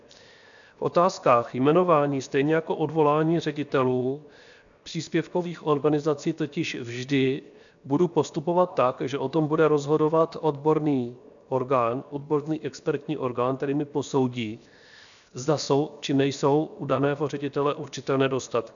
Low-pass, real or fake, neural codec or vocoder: 7.2 kHz; fake; codec, 16 kHz, 0.7 kbps, FocalCodec